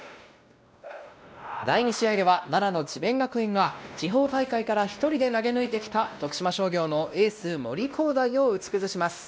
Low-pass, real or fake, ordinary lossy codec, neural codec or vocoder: none; fake; none; codec, 16 kHz, 1 kbps, X-Codec, WavLM features, trained on Multilingual LibriSpeech